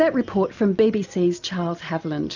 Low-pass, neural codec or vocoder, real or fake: 7.2 kHz; none; real